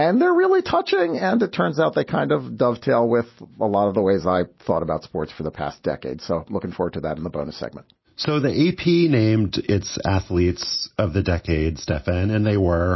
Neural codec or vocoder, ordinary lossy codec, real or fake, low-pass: none; MP3, 24 kbps; real; 7.2 kHz